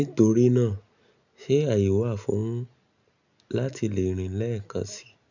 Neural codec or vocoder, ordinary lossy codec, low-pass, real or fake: none; none; 7.2 kHz; real